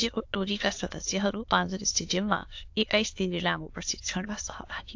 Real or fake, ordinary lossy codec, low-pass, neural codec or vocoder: fake; AAC, 48 kbps; 7.2 kHz; autoencoder, 22.05 kHz, a latent of 192 numbers a frame, VITS, trained on many speakers